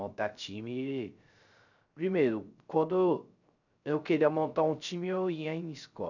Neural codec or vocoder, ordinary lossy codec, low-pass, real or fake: codec, 16 kHz, 0.3 kbps, FocalCodec; none; 7.2 kHz; fake